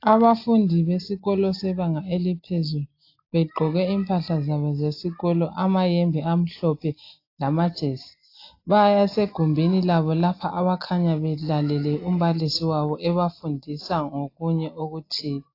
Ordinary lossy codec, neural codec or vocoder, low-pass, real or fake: AAC, 32 kbps; none; 5.4 kHz; real